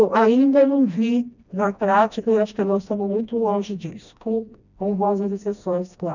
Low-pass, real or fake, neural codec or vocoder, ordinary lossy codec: 7.2 kHz; fake; codec, 16 kHz, 1 kbps, FreqCodec, smaller model; none